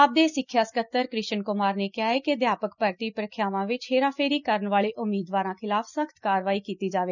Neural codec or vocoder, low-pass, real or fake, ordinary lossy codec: none; 7.2 kHz; real; none